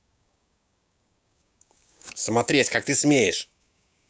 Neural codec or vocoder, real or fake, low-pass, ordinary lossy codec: codec, 16 kHz, 6 kbps, DAC; fake; none; none